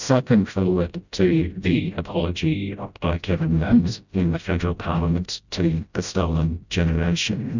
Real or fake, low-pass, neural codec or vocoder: fake; 7.2 kHz; codec, 16 kHz, 0.5 kbps, FreqCodec, smaller model